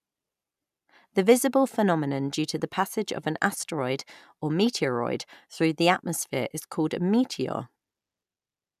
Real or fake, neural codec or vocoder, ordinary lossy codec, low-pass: real; none; none; 14.4 kHz